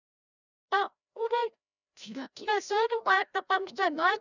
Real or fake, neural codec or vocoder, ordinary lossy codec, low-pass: fake; codec, 16 kHz, 0.5 kbps, FreqCodec, larger model; none; 7.2 kHz